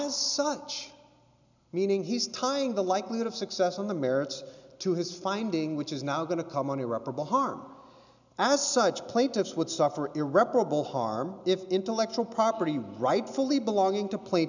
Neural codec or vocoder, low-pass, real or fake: none; 7.2 kHz; real